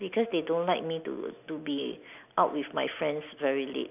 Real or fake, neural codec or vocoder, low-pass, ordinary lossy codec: real; none; 3.6 kHz; none